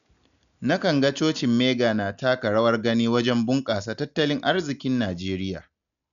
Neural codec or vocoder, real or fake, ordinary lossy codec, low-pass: none; real; none; 7.2 kHz